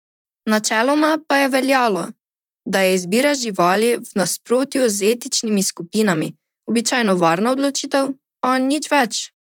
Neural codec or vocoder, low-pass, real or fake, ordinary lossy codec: vocoder, 44.1 kHz, 128 mel bands, Pupu-Vocoder; 19.8 kHz; fake; none